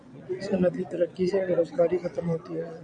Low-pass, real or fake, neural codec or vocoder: 9.9 kHz; fake; vocoder, 22.05 kHz, 80 mel bands, Vocos